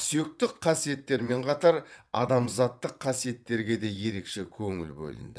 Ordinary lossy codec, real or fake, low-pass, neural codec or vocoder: none; fake; none; vocoder, 22.05 kHz, 80 mel bands, WaveNeXt